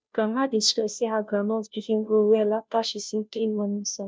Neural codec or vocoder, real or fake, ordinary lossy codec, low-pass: codec, 16 kHz, 0.5 kbps, FunCodec, trained on Chinese and English, 25 frames a second; fake; none; none